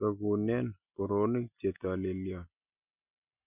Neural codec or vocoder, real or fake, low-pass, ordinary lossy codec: none; real; 3.6 kHz; none